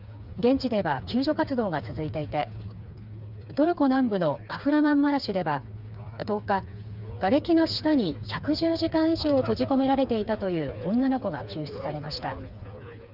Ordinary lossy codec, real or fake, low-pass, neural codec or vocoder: Opus, 64 kbps; fake; 5.4 kHz; codec, 16 kHz, 4 kbps, FreqCodec, smaller model